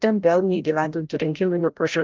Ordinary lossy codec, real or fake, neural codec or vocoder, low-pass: Opus, 32 kbps; fake; codec, 16 kHz, 0.5 kbps, FreqCodec, larger model; 7.2 kHz